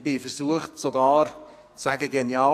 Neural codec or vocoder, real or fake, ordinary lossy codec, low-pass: codec, 44.1 kHz, 2.6 kbps, SNAC; fake; none; 14.4 kHz